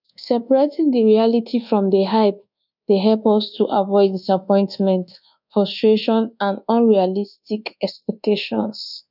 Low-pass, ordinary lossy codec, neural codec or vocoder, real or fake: 5.4 kHz; none; codec, 24 kHz, 1.2 kbps, DualCodec; fake